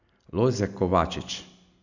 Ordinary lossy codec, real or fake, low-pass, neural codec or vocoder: none; real; 7.2 kHz; none